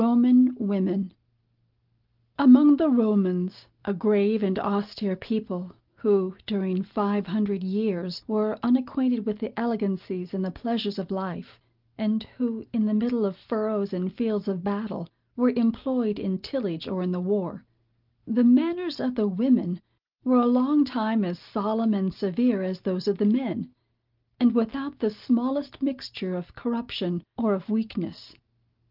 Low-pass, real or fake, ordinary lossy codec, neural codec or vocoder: 5.4 kHz; real; Opus, 32 kbps; none